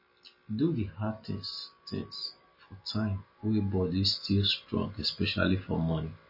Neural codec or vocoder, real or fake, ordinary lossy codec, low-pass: none; real; MP3, 24 kbps; 5.4 kHz